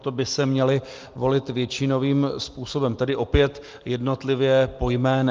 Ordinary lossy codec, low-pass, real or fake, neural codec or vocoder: Opus, 32 kbps; 7.2 kHz; real; none